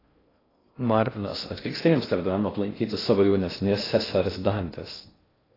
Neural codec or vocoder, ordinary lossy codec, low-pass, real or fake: codec, 16 kHz in and 24 kHz out, 0.6 kbps, FocalCodec, streaming, 2048 codes; AAC, 24 kbps; 5.4 kHz; fake